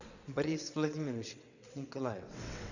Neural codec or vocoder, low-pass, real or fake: vocoder, 22.05 kHz, 80 mel bands, WaveNeXt; 7.2 kHz; fake